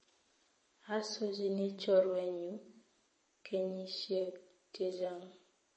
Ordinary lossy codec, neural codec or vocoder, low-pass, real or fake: MP3, 32 kbps; vocoder, 22.05 kHz, 80 mel bands, WaveNeXt; 9.9 kHz; fake